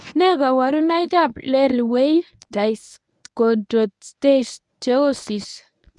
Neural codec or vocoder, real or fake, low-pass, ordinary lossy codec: codec, 24 kHz, 0.9 kbps, WavTokenizer, medium speech release version 1; fake; 10.8 kHz; none